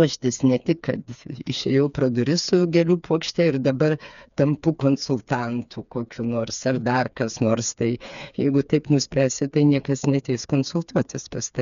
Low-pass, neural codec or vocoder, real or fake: 7.2 kHz; codec, 16 kHz, 4 kbps, FreqCodec, smaller model; fake